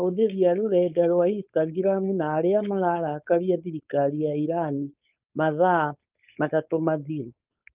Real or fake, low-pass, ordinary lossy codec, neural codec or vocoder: fake; 3.6 kHz; Opus, 24 kbps; codec, 16 kHz, 4.8 kbps, FACodec